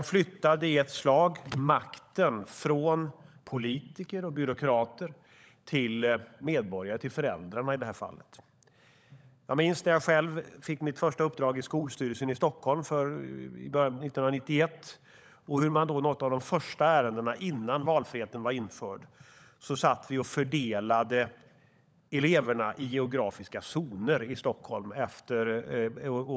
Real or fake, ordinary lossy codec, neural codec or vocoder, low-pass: fake; none; codec, 16 kHz, 16 kbps, FunCodec, trained on LibriTTS, 50 frames a second; none